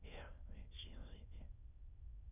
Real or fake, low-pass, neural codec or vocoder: fake; 3.6 kHz; autoencoder, 22.05 kHz, a latent of 192 numbers a frame, VITS, trained on many speakers